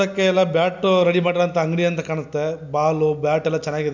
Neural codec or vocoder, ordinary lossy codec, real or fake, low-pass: none; none; real; 7.2 kHz